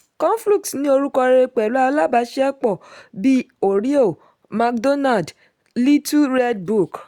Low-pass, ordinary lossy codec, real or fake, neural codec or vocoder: 19.8 kHz; Opus, 64 kbps; real; none